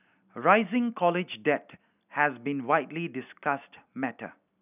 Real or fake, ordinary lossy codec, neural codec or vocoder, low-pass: real; none; none; 3.6 kHz